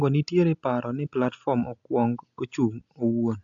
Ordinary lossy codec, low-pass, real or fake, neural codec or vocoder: AAC, 64 kbps; 7.2 kHz; real; none